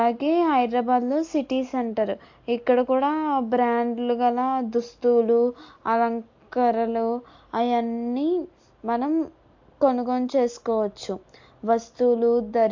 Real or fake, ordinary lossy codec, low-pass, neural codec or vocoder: real; AAC, 48 kbps; 7.2 kHz; none